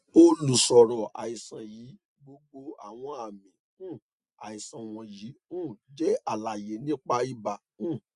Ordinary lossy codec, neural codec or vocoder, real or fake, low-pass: Opus, 64 kbps; none; real; 10.8 kHz